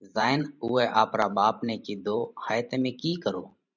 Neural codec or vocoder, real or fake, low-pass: vocoder, 44.1 kHz, 128 mel bands every 512 samples, BigVGAN v2; fake; 7.2 kHz